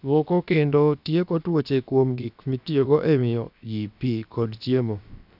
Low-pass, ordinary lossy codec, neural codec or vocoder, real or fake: 5.4 kHz; none; codec, 16 kHz, about 1 kbps, DyCAST, with the encoder's durations; fake